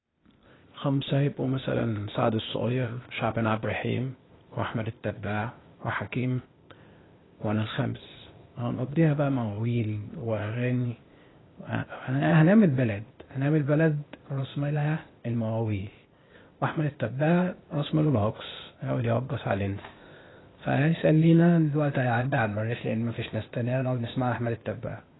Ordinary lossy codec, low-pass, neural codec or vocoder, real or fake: AAC, 16 kbps; 7.2 kHz; codec, 16 kHz, 0.8 kbps, ZipCodec; fake